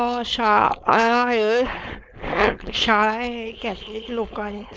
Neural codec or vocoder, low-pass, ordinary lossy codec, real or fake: codec, 16 kHz, 4.8 kbps, FACodec; none; none; fake